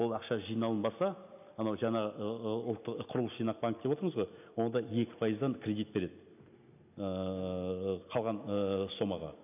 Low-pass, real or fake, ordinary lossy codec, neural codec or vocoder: 3.6 kHz; real; none; none